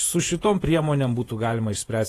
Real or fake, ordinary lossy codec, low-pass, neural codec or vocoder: fake; AAC, 48 kbps; 14.4 kHz; vocoder, 48 kHz, 128 mel bands, Vocos